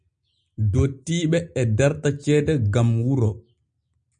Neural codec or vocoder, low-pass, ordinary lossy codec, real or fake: none; 9.9 kHz; MP3, 96 kbps; real